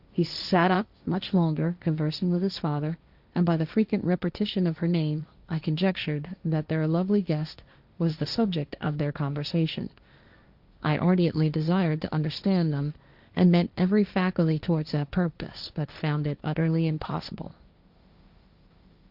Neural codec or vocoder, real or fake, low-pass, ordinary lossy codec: codec, 16 kHz, 1.1 kbps, Voila-Tokenizer; fake; 5.4 kHz; Opus, 64 kbps